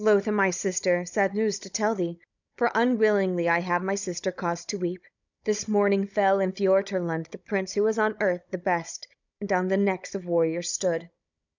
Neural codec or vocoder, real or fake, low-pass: codec, 16 kHz, 16 kbps, FunCodec, trained on Chinese and English, 50 frames a second; fake; 7.2 kHz